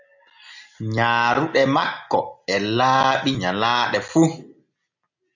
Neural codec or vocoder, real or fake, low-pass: none; real; 7.2 kHz